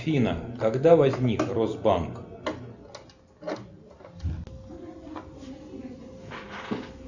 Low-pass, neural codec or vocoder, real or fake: 7.2 kHz; none; real